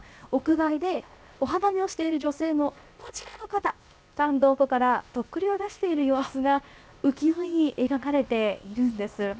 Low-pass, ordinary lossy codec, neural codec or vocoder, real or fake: none; none; codec, 16 kHz, 0.7 kbps, FocalCodec; fake